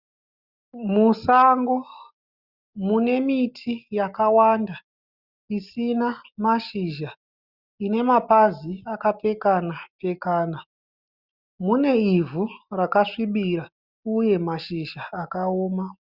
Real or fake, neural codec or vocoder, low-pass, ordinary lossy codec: real; none; 5.4 kHz; Opus, 64 kbps